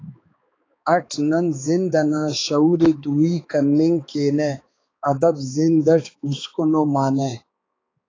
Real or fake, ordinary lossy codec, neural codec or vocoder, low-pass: fake; AAC, 32 kbps; codec, 16 kHz, 4 kbps, X-Codec, HuBERT features, trained on balanced general audio; 7.2 kHz